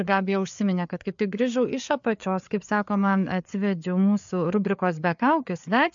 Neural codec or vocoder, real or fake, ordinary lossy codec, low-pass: codec, 16 kHz, 4 kbps, FreqCodec, larger model; fake; MP3, 64 kbps; 7.2 kHz